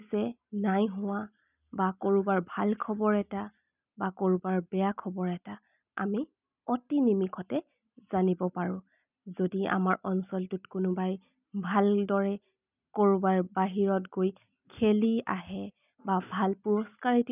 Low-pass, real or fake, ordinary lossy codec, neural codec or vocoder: 3.6 kHz; real; none; none